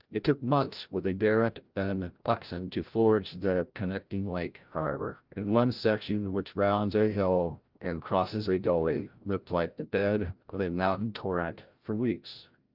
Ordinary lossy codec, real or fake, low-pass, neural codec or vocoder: Opus, 24 kbps; fake; 5.4 kHz; codec, 16 kHz, 0.5 kbps, FreqCodec, larger model